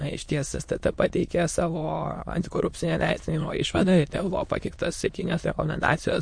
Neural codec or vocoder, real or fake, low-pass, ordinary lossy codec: autoencoder, 22.05 kHz, a latent of 192 numbers a frame, VITS, trained on many speakers; fake; 9.9 kHz; MP3, 48 kbps